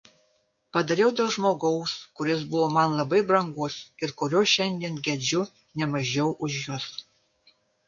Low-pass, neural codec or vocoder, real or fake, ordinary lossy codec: 7.2 kHz; codec, 16 kHz, 6 kbps, DAC; fake; MP3, 48 kbps